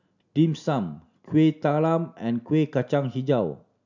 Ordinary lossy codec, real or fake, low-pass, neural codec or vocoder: none; real; 7.2 kHz; none